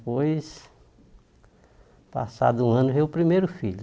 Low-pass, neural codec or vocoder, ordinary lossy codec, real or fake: none; none; none; real